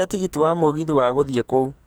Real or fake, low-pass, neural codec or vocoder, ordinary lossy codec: fake; none; codec, 44.1 kHz, 2.6 kbps, SNAC; none